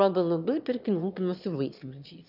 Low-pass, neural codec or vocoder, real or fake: 5.4 kHz; autoencoder, 22.05 kHz, a latent of 192 numbers a frame, VITS, trained on one speaker; fake